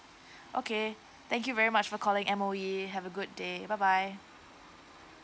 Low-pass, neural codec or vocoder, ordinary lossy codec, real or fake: none; none; none; real